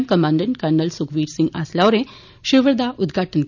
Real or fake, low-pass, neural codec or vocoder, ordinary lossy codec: real; 7.2 kHz; none; none